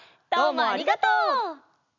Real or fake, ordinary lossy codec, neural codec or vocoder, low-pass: real; none; none; 7.2 kHz